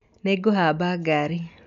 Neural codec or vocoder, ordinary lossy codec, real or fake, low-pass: none; none; real; 7.2 kHz